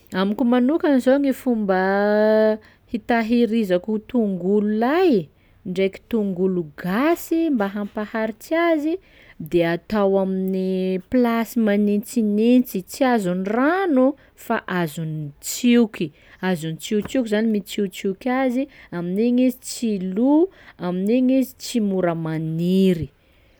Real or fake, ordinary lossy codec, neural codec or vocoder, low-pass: real; none; none; none